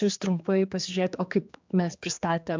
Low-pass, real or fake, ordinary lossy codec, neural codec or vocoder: 7.2 kHz; fake; MP3, 64 kbps; codec, 16 kHz, 2 kbps, X-Codec, HuBERT features, trained on general audio